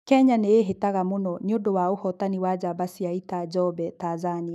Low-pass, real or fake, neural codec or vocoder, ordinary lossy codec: 14.4 kHz; fake; autoencoder, 48 kHz, 128 numbers a frame, DAC-VAE, trained on Japanese speech; none